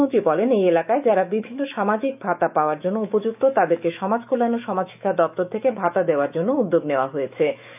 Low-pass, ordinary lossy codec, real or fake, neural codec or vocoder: 3.6 kHz; none; fake; autoencoder, 48 kHz, 128 numbers a frame, DAC-VAE, trained on Japanese speech